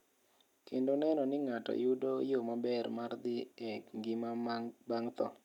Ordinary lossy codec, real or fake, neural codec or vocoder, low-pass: none; real; none; 19.8 kHz